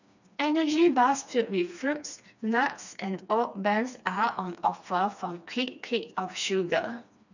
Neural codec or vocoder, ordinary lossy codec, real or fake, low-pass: codec, 16 kHz, 2 kbps, FreqCodec, smaller model; none; fake; 7.2 kHz